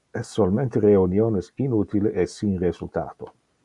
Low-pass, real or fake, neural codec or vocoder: 10.8 kHz; real; none